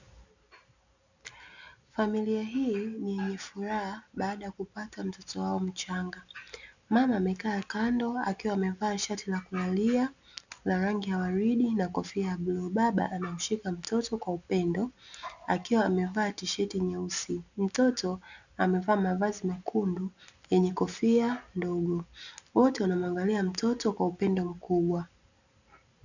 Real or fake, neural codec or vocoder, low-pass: real; none; 7.2 kHz